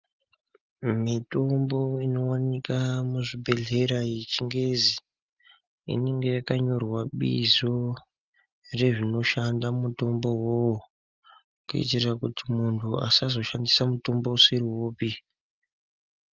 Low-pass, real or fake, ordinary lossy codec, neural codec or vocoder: 7.2 kHz; real; Opus, 32 kbps; none